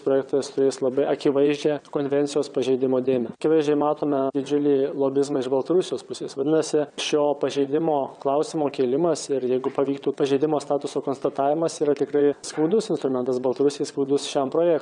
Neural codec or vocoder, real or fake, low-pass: vocoder, 22.05 kHz, 80 mel bands, WaveNeXt; fake; 9.9 kHz